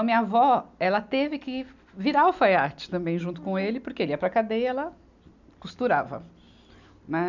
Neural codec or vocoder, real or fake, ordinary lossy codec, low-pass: none; real; none; 7.2 kHz